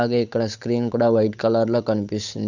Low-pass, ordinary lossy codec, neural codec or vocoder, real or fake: 7.2 kHz; none; codec, 16 kHz, 8 kbps, FunCodec, trained on Chinese and English, 25 frames a second; fake